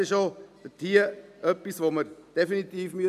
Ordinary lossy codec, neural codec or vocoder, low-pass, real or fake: none; none; 14.4 kHz; real